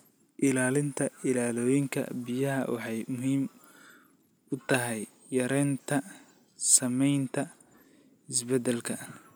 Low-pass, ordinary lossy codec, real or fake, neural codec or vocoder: none; none; real; none